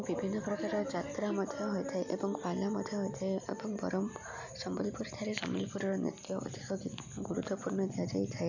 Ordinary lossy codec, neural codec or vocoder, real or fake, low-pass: none; none; real; 7.2 kHz